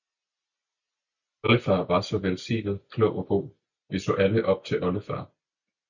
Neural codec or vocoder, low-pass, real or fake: none; 7.2 kHz; real